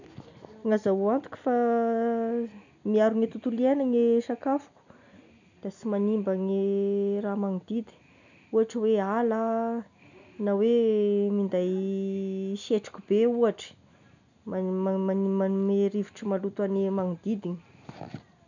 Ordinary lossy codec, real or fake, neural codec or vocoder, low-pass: none; real; none; 7.2 kHz